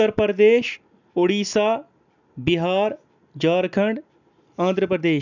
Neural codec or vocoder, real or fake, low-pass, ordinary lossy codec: none; real; 7.2 kHz; none